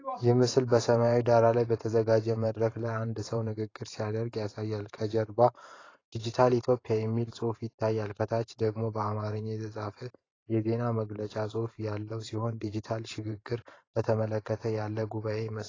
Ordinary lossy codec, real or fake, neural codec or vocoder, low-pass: AAC, 32 kbps; fake; vocoder, 44.1 kHz, 128 mel bands every 512 samples, BigVGAN v2; 7.2 kHz